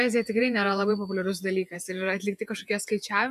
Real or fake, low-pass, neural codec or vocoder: fake; 14.4 kHz; vocoder, 48 kHz, 128 mel bands, Vocos